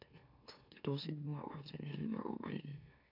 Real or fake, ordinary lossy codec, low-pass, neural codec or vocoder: fake; AAC, 48 kbps; 5.4 kHz; autoencoder, 44.1 kHz, a latent of 192 numbers a frame, MeloTTS